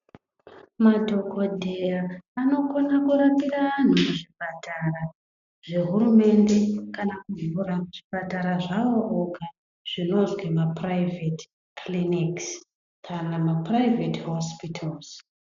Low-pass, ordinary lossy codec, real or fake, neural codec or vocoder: 7.2 kHz; MP3, 64 kbps; real; none